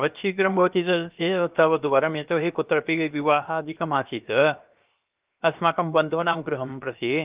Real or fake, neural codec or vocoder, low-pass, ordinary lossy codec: fake; codec, 16 kHz, 0.7 kbps, FocalCodec; 3.6 kHz; Opus, 24 kbps